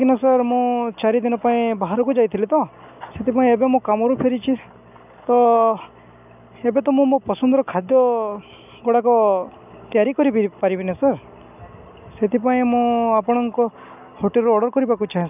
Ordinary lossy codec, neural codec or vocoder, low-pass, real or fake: none; none; 3.6 kHz; real